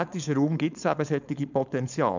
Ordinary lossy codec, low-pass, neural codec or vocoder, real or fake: none; 7.2 kHz; codec, 16 kHz, 4.8 kbps, FACodec; fake